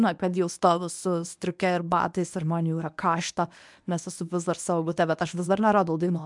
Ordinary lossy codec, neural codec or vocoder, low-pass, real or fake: MP3, 96 kbps; codec, 24 kHz, 0.9 kbps, WavTokenizer, medium speech release version 1; 10.8 kHz; fake